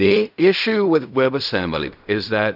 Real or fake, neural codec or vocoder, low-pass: fake; codec, 16 kHz in and 24 kHz out, 0.4 kbps, LongCat-Audio-Codec, fine tuned four codebook decoder; 5.4 kHz